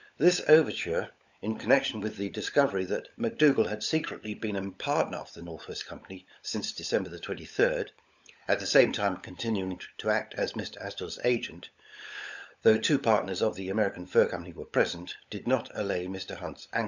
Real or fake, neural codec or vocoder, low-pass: fake; codec, 16 kHz, 16 kbps, FunCodec, trained on LibriTTS, 50 frames a second; 7.2 kHz